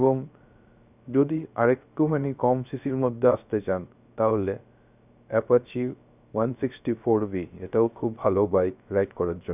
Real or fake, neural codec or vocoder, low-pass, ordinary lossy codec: fake; codec, 16 kHz, 0.3 kbps, FocalCodec; 3.6 kHz; none